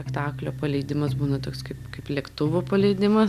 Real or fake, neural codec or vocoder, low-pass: real; none; 14.4 kHz